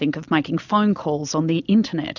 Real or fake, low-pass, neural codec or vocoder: real; 7.2 kHz; none